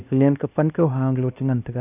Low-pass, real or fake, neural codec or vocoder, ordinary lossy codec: 3.6 kHz; fake; codec, 16 kHz, 1 kbps, X-Codec, HuBERT features, trained on LibriSpeech; none